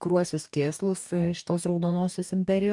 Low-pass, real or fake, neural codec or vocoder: 10.8 kHz; fake; codec, 44.1 kHz, 2.6 kbps, DAC